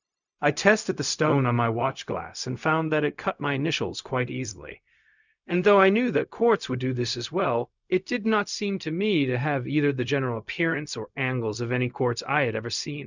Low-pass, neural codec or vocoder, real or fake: 7.2 kHz; codec, 16 kHz, 0.4 kbps, LongCat-Audio-Codec; fake